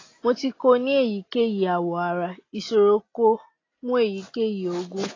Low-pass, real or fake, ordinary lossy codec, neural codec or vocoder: 7.2 kHz; real; AAC, 32 kbps; none